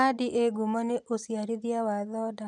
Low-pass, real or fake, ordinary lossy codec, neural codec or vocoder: 10.8 kHz; real; none; none